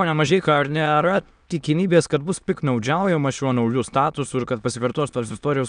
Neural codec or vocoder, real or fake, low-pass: autoencoder, 22.05 kHz, a latent of 192 numbers a frame, VITS, trained on many speakers; fake; 9.9 kHz